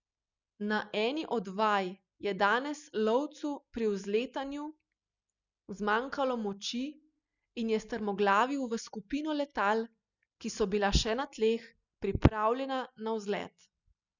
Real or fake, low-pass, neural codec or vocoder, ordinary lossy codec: real; 7.2 kHz; none; none